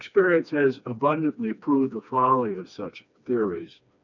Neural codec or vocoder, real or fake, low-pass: codec, 16 kHz, 2 kbps, FreqCodec, smaller model; fake; 7.2 kHz